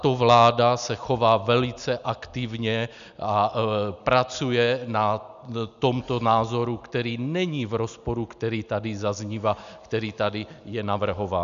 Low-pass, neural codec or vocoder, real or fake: 7.2 kHz; none; real